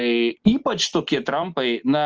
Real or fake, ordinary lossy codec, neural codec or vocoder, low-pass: real; Opus, 24 kbps; none; 7.2 kHz